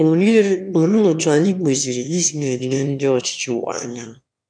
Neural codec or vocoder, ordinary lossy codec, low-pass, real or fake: autoencoder, 22.05 kHz, a latent of 192 numbers a frame, VITS, trained on one speaker; none; 9.9 kHz; fake